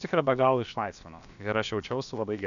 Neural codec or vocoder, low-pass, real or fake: codec, 16 kHz, about 1 kbps, DyCAST, with the encoder's durations; 7.2 kHz; fake